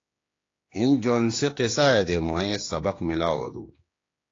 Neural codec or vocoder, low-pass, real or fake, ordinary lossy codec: codec, 16 kHz, 2 kbps, X-Codec, HuBERT features, trained on general audio; 7.2 kHz; fake; AAC, 32 kbps